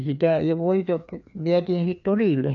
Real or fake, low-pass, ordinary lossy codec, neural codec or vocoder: fake; 7.2 kHz; none; codec, 16 kHz, 2 kbps, FreqCodec, larger model